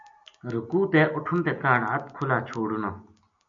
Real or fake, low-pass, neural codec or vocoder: real; 7.2 kHz; none